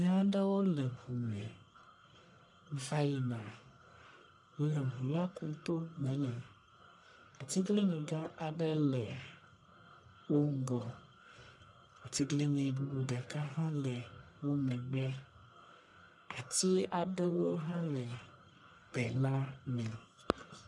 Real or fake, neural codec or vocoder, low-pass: fake; codec, 44.1 kHz, 1.7 kbps, Pupu-Codec; 10.8 kHz